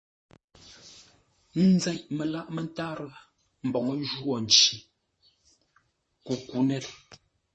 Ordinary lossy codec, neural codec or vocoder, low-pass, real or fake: MP3, 32 kbps; vocoder, 44.1 kHz, 128 mel bands, Pupu-Vocoder; 10.8 kHz; fake